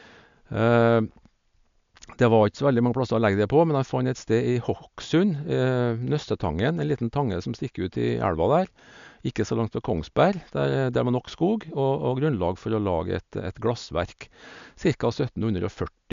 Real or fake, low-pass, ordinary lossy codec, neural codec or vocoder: real; 7.2 kHz; MP3, 64 kbps; none